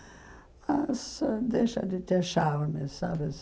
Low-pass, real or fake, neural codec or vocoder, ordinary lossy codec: none; real; none; none